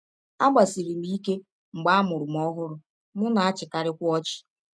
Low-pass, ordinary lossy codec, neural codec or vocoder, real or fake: none; none; none; real